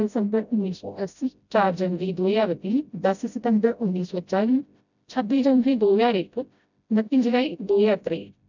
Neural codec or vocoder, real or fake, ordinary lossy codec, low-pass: codec, 16 kHz, 0.5 kbps, FreqCodec, smaller model; fake; none; 7.2 kHz